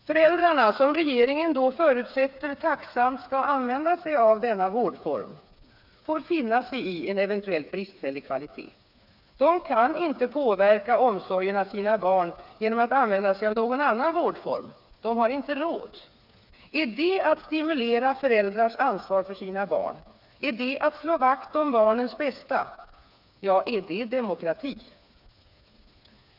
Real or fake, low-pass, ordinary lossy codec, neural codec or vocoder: fake; 5.4 kHz; none; codec, 16 kHz, 4 kbps, FreqCodec, smaller model